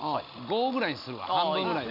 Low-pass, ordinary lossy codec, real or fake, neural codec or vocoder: 5.4 kHz; none; real; none